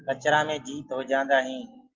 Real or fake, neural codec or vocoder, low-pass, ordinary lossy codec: real; none; 7.2 kHz; Opus, 24 kbps